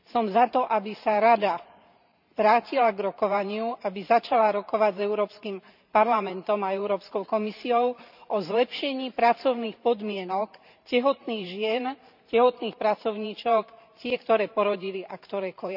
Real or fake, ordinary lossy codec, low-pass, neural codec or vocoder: fake; none; 5.4 kHz; vocoder, 44.1 kHz, 128 mel bands every 512 samples, BigVGAN v2